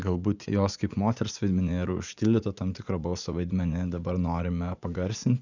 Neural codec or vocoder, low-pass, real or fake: vocoder, 44.1 kHz, 80 mel bands, Vocos; 7.2 kHz; fake